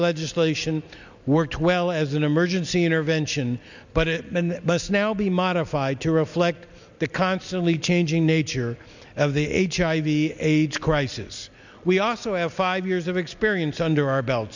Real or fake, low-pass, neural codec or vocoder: real; 7.2 kHz; none